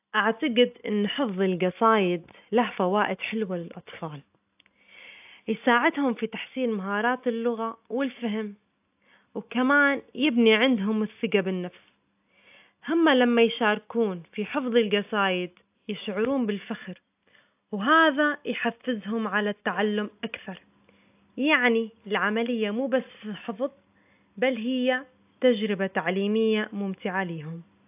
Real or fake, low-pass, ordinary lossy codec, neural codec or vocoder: real; 3.6 kHz; AAC, 32 kbps; none